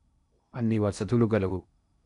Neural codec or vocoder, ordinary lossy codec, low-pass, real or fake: codec, 16 kHz in and 24 kHz out, 0.8 kbps, FocalCodec, streaming, 65536 codes; none; 10.8 kHz; fake